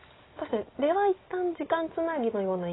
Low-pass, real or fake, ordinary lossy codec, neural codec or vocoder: 7.2 kHz; real; AAC, 16 kbps; none